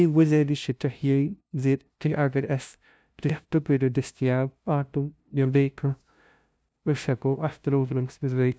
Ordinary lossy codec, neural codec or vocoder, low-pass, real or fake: none; codec, 16 kHz, 0.5 kbps, FunCodec, trained on LibriTTS, 25 frames a second; none; fake